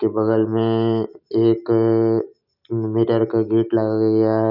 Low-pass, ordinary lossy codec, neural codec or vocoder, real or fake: 5.4 kHz; none; none; real